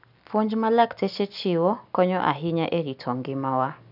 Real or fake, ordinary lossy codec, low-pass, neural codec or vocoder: real; none; 5.4 kHz; none